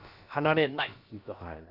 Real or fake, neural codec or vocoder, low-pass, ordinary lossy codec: fake; codec, 16 kHz, about 1 kbps, DyCAST, with the encoder's durations; 5.4 kHz; none